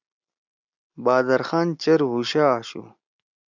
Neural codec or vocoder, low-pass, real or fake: none; 7.2 kHz; real